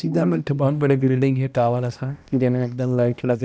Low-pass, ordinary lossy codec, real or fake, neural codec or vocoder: none; none; fake; codec, 16 kHz, 1 kbps, X-Codec, HuBERT features, trained on balanced general audio